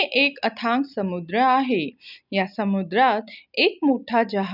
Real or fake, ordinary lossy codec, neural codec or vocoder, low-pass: real; none; none; 5.4 kHz